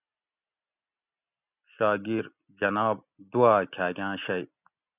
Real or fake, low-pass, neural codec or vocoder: real; 3.6 kHz; none